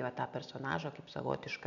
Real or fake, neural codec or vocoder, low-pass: real; none; 7.2 kHz